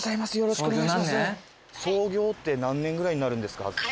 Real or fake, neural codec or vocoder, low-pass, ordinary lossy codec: real; none; none; none